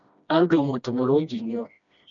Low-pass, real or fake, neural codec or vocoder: 7.2 kHz; fake; codec, 16 kHz, 1 kbps, FreqCodec, smaller model